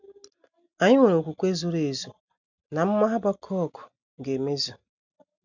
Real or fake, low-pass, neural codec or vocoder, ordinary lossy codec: real; 7.2 kHz; none; none